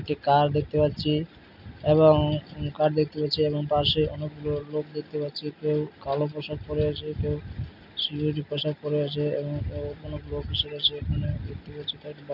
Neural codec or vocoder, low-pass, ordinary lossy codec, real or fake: none; 5.4 kHz; none; real